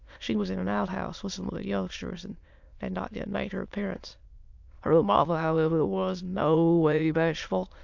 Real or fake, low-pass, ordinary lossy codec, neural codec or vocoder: fake; 7.2 kHz; MP3, 64 kbps; autoencoder, 22.05 kHz, a latent of 192 numbers a frame, VITS, trained on many speakers